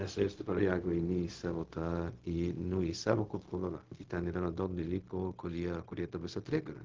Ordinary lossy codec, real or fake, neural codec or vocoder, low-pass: Opus, 16 kbps; fake; codec, 16 kHz, 0.4 kbps, LongCat-Audio-Codec; 7.2 kHz